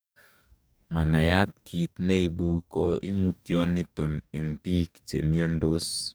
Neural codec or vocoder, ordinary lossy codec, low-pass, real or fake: codec, 44.1 kHz, 2.6 kbps, DAC; none; none; fake